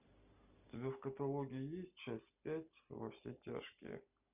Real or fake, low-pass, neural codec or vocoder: real; 3.6 kHz; none